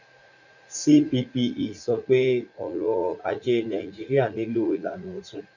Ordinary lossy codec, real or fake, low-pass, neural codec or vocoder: none; fake; 7.2 kHz; vocoder, 44.1 kHz, 80 mel bands, Vocos